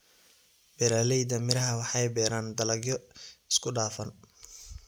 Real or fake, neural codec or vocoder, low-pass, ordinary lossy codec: real; none; none; none